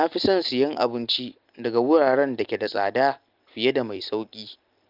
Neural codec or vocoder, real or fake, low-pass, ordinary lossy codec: none; real; 5.4 kHz; Opus, 32 kbps